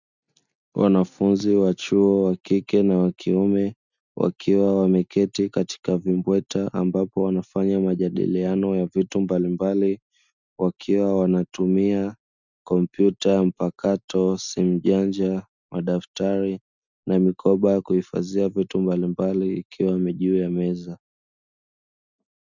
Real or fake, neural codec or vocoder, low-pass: real; none; 7.2 kHz